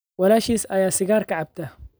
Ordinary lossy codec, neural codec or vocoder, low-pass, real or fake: none; none; none; real